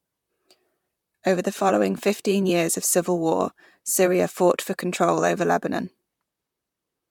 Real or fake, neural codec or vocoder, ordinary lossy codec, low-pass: fake; vocoder, 44.1 kHz, 128 mel bands, Pupu-Vocoder; MP3, 96 kbps; 19.8 kHz